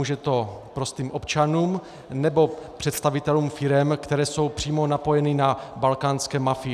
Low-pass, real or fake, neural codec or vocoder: 14.4 kHz; real; none